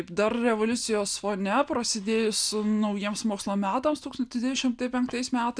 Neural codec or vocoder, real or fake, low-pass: none; real; 9.9 kHz